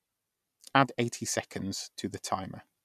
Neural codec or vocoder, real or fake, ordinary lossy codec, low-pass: none; real; none; 14.4 kHz